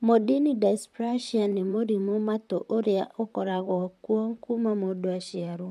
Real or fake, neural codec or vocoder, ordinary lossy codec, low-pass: fake; vocoder, 44.1 kHz, 128 mel bands, Pupu-Vocoder; none; 14.4 kHz